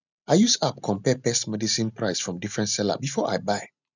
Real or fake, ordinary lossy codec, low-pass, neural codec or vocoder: real; none; 7.2 kHz; none